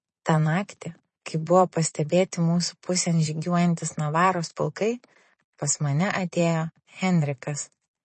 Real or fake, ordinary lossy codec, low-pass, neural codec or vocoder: fake; MP3, 32 kbps; 9.9 kHz; vocoder, 22.05 kHz, 80 mel bands, Vocos